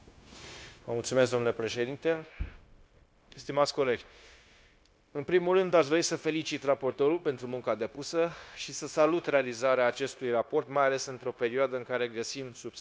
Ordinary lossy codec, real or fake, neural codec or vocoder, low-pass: none; fake; codec, 16 kHz, 0.9 kbps, LongCat-Audio-Codec; none